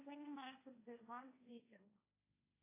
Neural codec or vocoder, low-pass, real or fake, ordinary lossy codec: codec, 16 kHz, 1.1 kbps, Voila-Tokenizer; 3.6 kHz; fake; AAC, 24 kbps